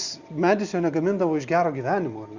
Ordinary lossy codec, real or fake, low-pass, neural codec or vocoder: Opus, 64 kbps; real; 7.2 kHz; none